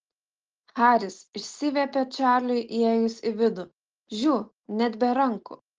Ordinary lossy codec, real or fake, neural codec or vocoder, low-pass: Opus, 16 kbps; real; none; 7.2 kHz